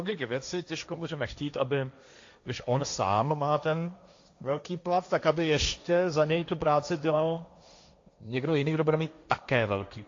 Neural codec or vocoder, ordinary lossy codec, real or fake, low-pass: codec, 16 kHz, 1.1 kbps, Voila-Tokenizer; AAC, 48 kbps; fake; 7.2 kHz